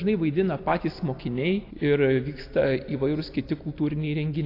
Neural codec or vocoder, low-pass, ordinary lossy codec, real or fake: none; 5.4 kHz; AAC, 32 kbps; real